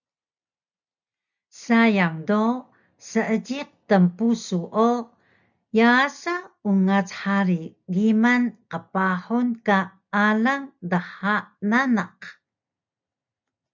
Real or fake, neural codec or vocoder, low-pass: real; none; 7.2 kHz